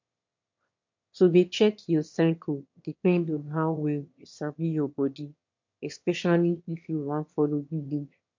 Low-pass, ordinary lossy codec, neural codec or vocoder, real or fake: 7.2 kHz; MP3, 48 kbps; autoencoder, 22.05 kHz, a latent of 192 numbers a frame, VITS, trained on one speaker; fake